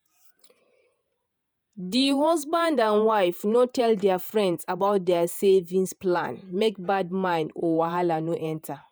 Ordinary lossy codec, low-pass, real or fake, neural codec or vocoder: none; none; fake; vocoder, 48 kHz, 128 mel bands, Vocos